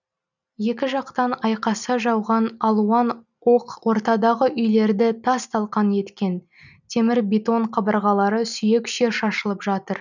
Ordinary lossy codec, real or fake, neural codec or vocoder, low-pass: none; real; none; 7.2 kHz